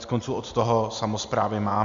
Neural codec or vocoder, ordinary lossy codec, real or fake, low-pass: none; MP3, 64 kbps; real; 7.2 kHz